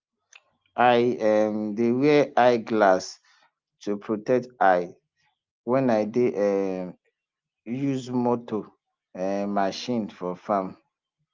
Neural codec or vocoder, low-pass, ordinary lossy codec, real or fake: none; 7.2 kHz; Opus, 32 kbps; real